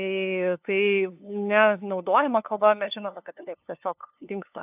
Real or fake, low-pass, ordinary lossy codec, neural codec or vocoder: fake; 3.6 kHz; AAC, 32 kbps; codec, 16 kHz, 2 kbps, FunCodec, trained on LibriTTS, 25 frames a second